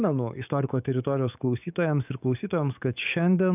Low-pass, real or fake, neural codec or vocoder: 3.6 kHz; fake; codec, 44.1 kHz, 7.8 kbps, DAC